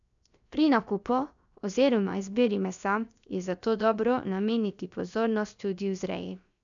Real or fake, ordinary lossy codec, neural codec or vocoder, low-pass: fake; none; codec, 16 kHz, 0.7 kbps, FocalCodec; 7.2 kHz